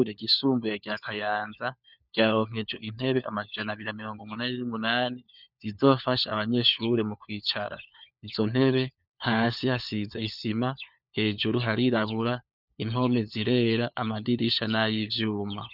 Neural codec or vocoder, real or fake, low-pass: codec, 16 kHz, 4 kbps, FunCodec, trained on LibriTTS, 50 frames a second; fake; 5.4 kHz